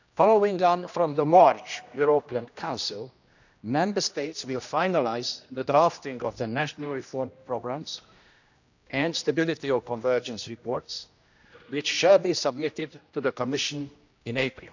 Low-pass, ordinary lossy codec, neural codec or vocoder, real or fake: 7.2 kHz; none; codec, 16 kHz, 1 kbps, X-Codec, HuBERT features, trained on general audio; fake